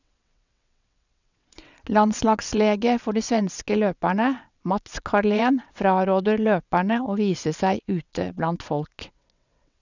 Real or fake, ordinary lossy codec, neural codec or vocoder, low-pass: fake; none; vocoder, 22.05 kHz, 80 mel bands, WaveNeXt; 7.2 kHz